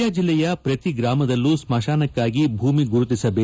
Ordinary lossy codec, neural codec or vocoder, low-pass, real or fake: none; none; none; real